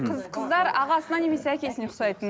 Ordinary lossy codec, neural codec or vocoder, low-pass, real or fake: none; none; none; real